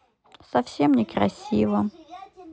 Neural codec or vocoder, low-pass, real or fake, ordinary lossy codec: none; none; real; none